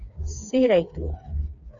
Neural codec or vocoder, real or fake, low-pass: codec, 16 kHz, 4 kbps, FreqCodec, smaller model; fake; 7.2 kHz